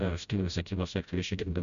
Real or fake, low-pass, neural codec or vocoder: fake; 7.2 kHz; codec, 16 kHz, 0.5 kbps, FreqCodec, smaller model